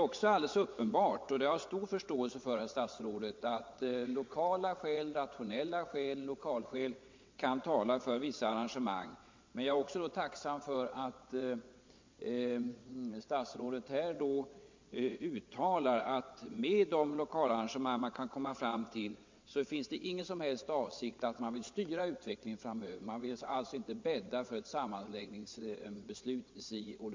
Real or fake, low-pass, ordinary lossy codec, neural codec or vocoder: fake; 7.2 kHz; MP3, 48 kbps; vocoder, 22.05 kHz, 80 mel bands, WaveNeXt